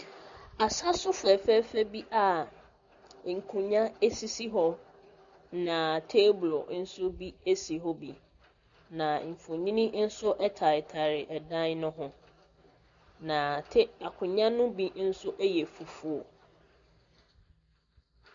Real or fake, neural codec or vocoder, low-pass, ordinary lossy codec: real; none; 7.2 kHz; MP3, 64 kbps